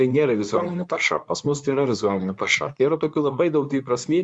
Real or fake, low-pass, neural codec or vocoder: fake; 10.8 kHz; codec, 24 kHz, 0.9 kbps, WavTokenizer, medium speech release version 2